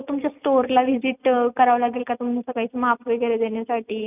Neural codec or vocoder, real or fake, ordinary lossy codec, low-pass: none; real; none; 3.6 kHz